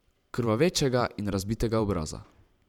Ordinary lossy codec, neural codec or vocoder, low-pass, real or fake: none; vocoder, 44.1 kHz, 128 mel bands every 512 samples, BigVGAN v2; 19.8 kHz; fake